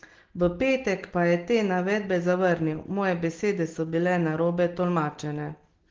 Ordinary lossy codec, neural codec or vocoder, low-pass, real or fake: Opus, 16 kbps; none; 7.2 kHz; real